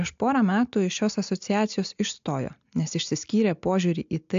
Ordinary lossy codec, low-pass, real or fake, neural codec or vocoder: AAC, 64 kbps; 7.2 kHz; real; none